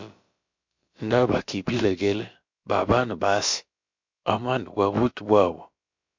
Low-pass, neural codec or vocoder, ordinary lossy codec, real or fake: 7.2 kHz; codec, 16 kHz, about 1 kbps, DyCAST, with the encoder's durations; MP3, 48 kbps; fake